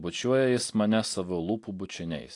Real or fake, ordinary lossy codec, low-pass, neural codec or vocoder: real; AAC, 48 kbps; 10.8 kHz; none